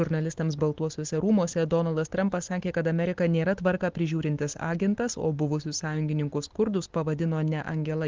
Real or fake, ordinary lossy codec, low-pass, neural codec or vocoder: real; Opus, 16 kbps; 7.2 kHz; none